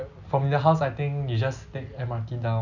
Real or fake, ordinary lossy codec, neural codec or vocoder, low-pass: real; none; none; 7.2 kHz